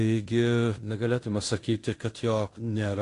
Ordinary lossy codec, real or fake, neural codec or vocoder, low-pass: AAC, 48 kbps; fake; codec, 16 kHz in and 24 kHz out, 0.9 kbps, LongCat-Audio-Codec, fine tuned four codebook decoder; 10.8 kHz